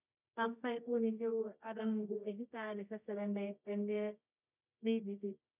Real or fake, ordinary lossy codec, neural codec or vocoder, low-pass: fake; none; codec, 24 kHz, 0.9 kbps, WavTokenizer, medium music audio release; 3.6 kHz